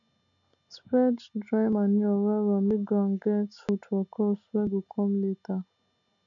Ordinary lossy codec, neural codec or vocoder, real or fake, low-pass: none; none; real; 7.2 kHz